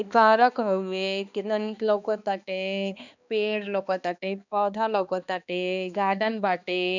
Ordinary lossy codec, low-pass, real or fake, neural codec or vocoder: none; 7.2 kHz; fake; codec, 16 kHz, 2 kbps, X-Codec, HuBERT features, trained on balanced general audio